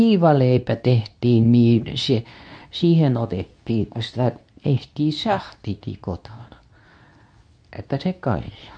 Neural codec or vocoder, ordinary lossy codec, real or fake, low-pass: codec, 24 kHz, 0.9 kbps, WavTokenizer, medium speech release version 2; none; fake; 9.9 kHz